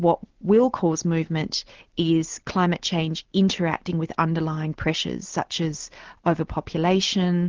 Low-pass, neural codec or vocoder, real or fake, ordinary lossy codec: 7.2 kHz; none; real; Opus, 16 kbps